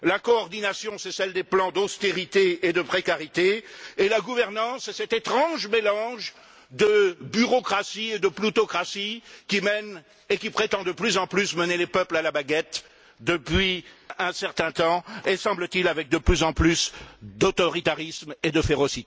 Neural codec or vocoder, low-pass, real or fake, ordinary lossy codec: none; none; real; none